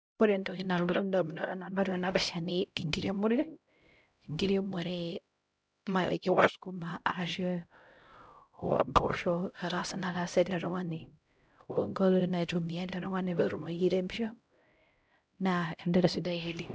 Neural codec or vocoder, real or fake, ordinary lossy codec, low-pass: codec, 16 kHz, 0.5 kbps, X-Codec, HuBERT features, trained on LibriSpeech; fake; none; none